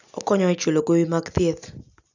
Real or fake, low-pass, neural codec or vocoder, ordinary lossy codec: real; 7.2 kHz; none; none